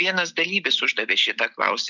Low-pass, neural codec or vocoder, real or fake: 7.2 kHz; none; real